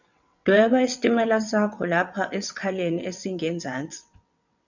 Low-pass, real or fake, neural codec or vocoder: 7.2 kHz; fake; vocoder, 22.05 kHz, 80 mel bands, WaveNeXt